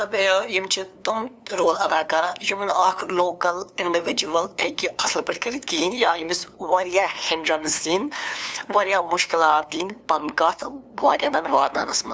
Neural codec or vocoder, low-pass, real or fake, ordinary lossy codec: codec, 16 kHz, 2 kbps, FunCodec, trained on LibriTTS, 25 frames a second; none; fake; none